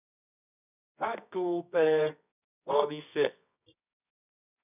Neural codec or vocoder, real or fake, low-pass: codec, 24 kHz, 0.9 kbps, WavTokenizer, medium music audio release; fake; 3.6 kHz